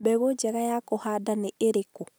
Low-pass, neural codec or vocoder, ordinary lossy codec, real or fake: none; none; none; real